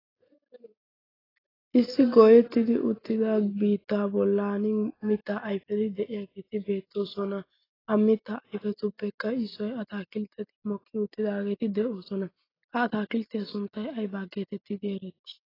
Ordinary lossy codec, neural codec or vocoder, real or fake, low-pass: AAC, 24 kbps; none; real; 5.4 kHz